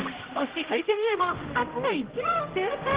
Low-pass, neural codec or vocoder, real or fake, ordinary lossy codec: 3.6 kHz; codec, 16 kHz, 0.5 kbps, X-Codec, HuBERT features, trained on general audio; fake; Opus, 16 kbps